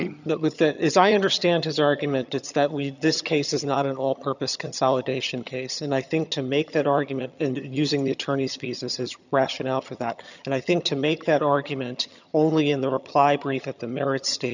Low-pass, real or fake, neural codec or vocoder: 7.2 kHz; fake; vocoder, 22.05 kHz, 80 mel bands, HiFi-GAN